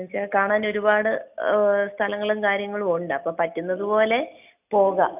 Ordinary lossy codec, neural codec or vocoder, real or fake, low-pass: none; none; real; 3.6 kHz